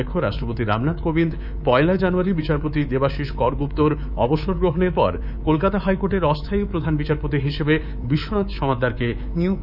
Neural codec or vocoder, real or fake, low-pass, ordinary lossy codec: codec, 24 kHz, 3.1 kbps, DualCodec; fake; 5.4 kHz; none